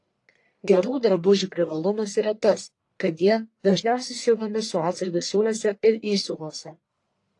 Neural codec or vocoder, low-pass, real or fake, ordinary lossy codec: codec, 44.1 kHz, 1.7 kbps, Pupu-Codec; 10.8 kHz; fake; AAC, 48 kbps